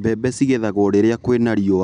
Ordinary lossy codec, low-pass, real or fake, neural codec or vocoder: none; 9.9 kHz; real; none